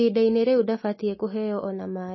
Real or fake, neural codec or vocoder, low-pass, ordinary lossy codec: real; none; 7.2 kHz; MP3, 24 kbps